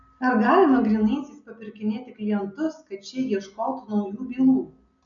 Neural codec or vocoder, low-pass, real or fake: none; 7.2 kHz; real